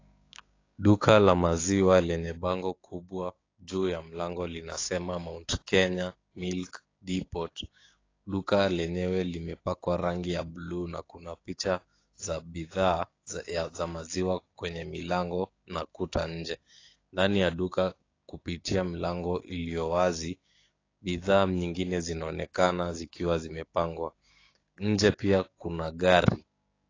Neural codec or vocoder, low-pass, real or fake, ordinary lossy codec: codec, 16 kHz, 6 kbps, DAC; 7.2 kHz; fake; AAC, 32 kbps